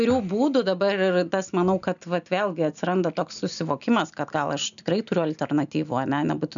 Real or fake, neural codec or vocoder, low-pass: real; none; 7.2 kHz